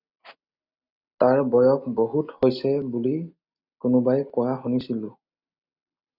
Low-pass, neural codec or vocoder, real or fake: 5.4 kHz; none; real